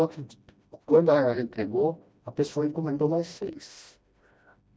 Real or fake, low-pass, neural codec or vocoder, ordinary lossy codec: fake; none; codec, 16 kHz, 1 kbps, FreqCodec, smaller model; none